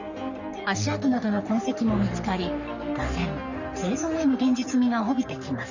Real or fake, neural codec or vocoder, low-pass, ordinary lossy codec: fake; codec, 44.1 kHz, 3.4 kbps, Pupu-Codec; 7.2 kHz; none